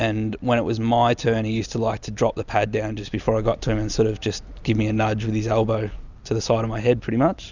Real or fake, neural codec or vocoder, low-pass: real; none; 7.2 kHz